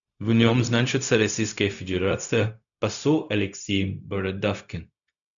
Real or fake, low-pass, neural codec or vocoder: fake; 7.2 kHz; codec, 16 kHz, 0.4 kbps, LongCat-Audio-Codec